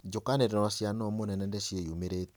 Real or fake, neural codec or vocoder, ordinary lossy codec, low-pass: real; none; none; none